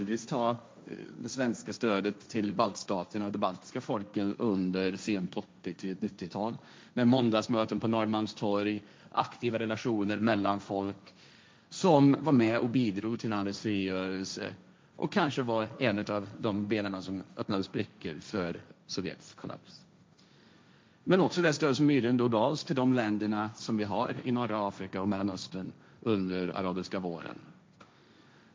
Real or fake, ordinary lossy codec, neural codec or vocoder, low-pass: fake; none; codec, 16 kHz, 1.1 kbps, Voila-Tokenizer; none